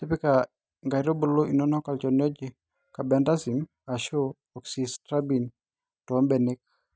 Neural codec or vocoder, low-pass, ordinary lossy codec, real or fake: none; none; none; real